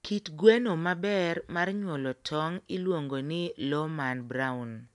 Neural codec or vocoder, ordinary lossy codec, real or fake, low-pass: none; none; real; 10.8 kHz